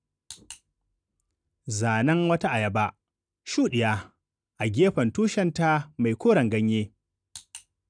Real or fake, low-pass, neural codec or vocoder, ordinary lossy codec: real; 9.9 kHz; none; none